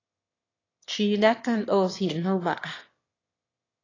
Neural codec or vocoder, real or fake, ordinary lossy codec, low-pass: autoencoder, 22.05 kHz, a latent of 192 numbers a frame, VITS, trained on one speaker; fake; AAC, 32 kbps; 7.2 kHz